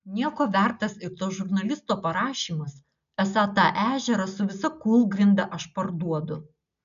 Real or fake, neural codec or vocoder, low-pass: real; none; 7.2 kHz